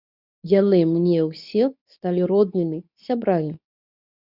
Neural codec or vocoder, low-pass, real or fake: codec, 24 kHz, 0.9 kbps, WavTokenizer, medium speech release version 2; 5.4 kHz; fake